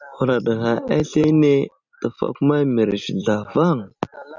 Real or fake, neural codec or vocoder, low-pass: fake; vocoder, 44.1 kHz, 128 mel bands every 256 samples, BigVGAN v2; 7.2 kHz